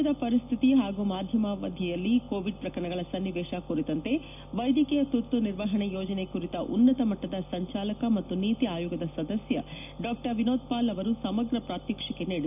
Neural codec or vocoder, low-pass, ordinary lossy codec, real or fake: none; 3.6 kHz; none; real